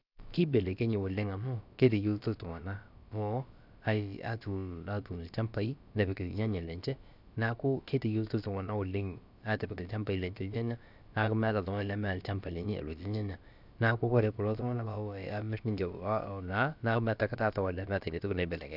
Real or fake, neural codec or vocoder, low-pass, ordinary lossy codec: fake; codec, 16 kHz, about 1 kbps, DyCAST, with the encoder's durations; 5.4 kHz; none